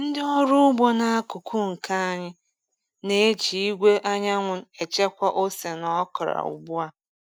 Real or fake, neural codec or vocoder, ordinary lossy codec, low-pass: real; none; none; none